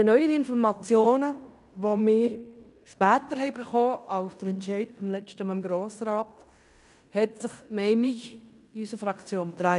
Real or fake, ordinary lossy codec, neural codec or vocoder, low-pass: fake; none; codec, 16 kHz in and 24 kHz out, 0.9 kbps, LongCat-Audio-Codec, fine tuned four codebook decoder; 10.8 kHz